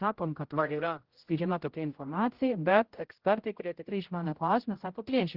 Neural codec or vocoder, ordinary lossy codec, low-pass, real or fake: codec, 16 kHz, 0.5 kbps, X-Codec, HuBERT features, trained on general audio; Opus, 16 kbps; 5.4 kHz; fake